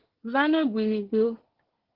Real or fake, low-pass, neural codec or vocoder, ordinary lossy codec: fake; 5.4 kHz; codec, 24 kHz, 1 kbps, SNAC; Opus, 16 kbps